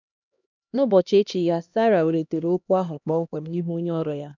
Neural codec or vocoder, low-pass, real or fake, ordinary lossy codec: codec, 16 kHz, 1 kbps, X-Codec, HuBERT features, trained on LibriSpeech; 7.2 kHz; fake; none